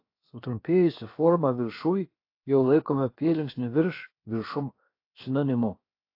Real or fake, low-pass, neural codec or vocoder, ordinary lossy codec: fake; 5.4 kHz; codec, 16 kHz, about 1 kbps, DyCAST, with the encoder's durations; AAC, 32 kbps